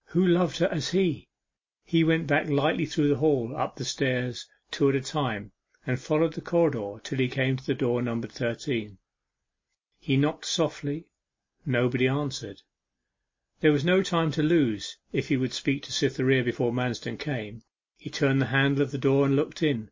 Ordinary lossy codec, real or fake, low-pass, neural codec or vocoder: MP3, 32 kbps; real; 7.2 kHz; none